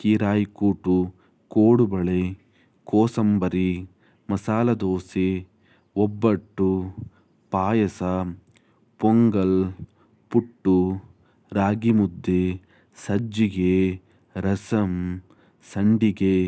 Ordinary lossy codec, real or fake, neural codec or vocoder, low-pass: none; real; none; none